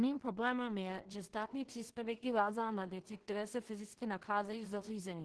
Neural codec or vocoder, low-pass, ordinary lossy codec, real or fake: codec, 16 kHz in and 24 kHz out, 0.4 kbps, LongCat-Audio-Codec, two codebook decoder; 10.8 kHz; Opus, 16 kbps; fake